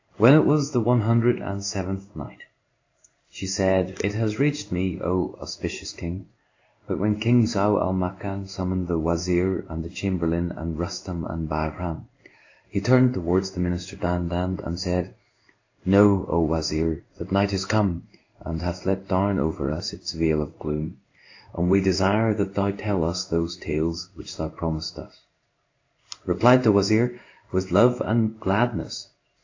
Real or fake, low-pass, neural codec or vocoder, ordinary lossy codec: fake; 7.2 kHz; codec, 16 kHz in and 24 kHz out, 1 kbps, XY-Tokenizer; AAC, 48 kbps